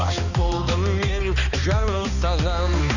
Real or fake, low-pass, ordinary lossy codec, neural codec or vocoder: fake; 7.2 kHz; none; codec, 16 kHz, 2 kbps, X-Codec, HuBERT features, trained on balanced general audio